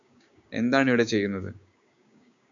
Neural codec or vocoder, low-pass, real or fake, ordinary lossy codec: codec, 16 kHz, 6 kbps, DAC; 7.2 kHz; fake; AAC, 64 kbps